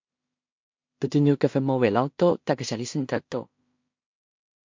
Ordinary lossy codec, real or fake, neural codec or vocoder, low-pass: MP3, 48 kbps; fake; codec, 16 kHz in and 24 kHz out, 0.4 kbps, LongCat-Audio-Codec, two codebook decoder; 7.2 kHz